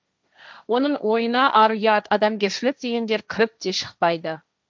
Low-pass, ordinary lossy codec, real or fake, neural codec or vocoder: none; none; fake; codec, 16 kHz, 1.1 kbps, Voila-Tokenizer